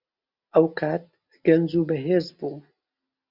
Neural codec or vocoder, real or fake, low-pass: none; real; 5.4 kHz